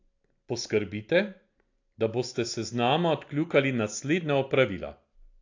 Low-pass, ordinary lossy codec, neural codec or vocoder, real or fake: 7.2 kHz; AAC, 48 kbps; none; real